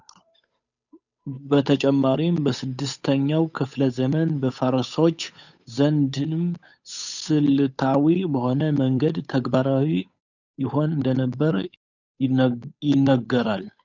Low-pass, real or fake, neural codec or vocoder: 7.2 kHz; fake; codec, 16 kHz, 8 kbps, FunCodec, trained on Chinese and English, 25 frames a second